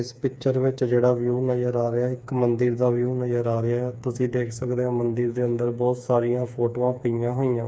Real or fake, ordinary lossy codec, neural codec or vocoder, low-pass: fake; none; codec, 16 kHz, 4 kbps, FreqCodec, smaller model; none